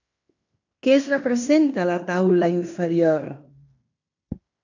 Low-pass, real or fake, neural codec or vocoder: 7.2 kHz; fake; codec, 16 kHz in and 24 kHz out, 0.9 kbps, LongCat-Audio-Codec, fine tuned four codebook decoder